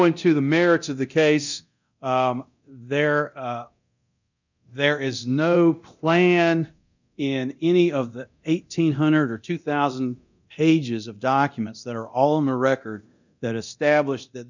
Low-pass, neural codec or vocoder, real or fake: 7.2 kHz; codec, 24 kHz, 0.9 kbps, DualCodec; fake